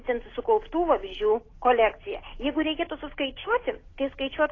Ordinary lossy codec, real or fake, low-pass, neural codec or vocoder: AAC, 32 kbps; real; 7.2 kHz; none